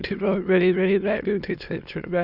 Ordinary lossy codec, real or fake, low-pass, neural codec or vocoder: none; fake; 5.4 kHz; autoencoder, 22.05 kHz, a latent of 192 numbers a frame, VITS, trained on many speakers